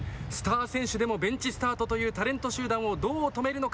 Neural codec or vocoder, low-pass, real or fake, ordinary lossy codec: none; none; real; none